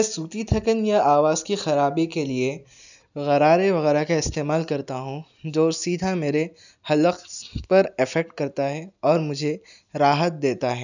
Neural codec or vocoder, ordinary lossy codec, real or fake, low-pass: none; none; real; 7.2 kHz